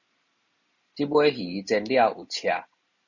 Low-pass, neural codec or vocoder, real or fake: 7.2 kHz; none; real